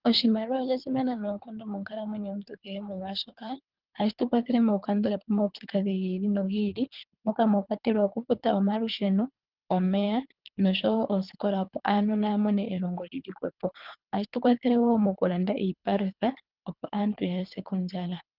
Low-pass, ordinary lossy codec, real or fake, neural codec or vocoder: 5.4 kHz; Opus, 24 kbps; fake; codec, 24 kHz, 3 kbps, HILCodec